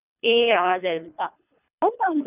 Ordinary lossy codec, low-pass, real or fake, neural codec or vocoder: none; 3.6 kHz; fake; codec, 24 kHz, 3 kbps, HILCodec